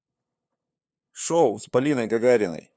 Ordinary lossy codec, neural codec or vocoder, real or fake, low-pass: none; codec, 16 kHz, 2 kbps, FunCodec, trained on LibriTTS, 25 frames a second; fake; none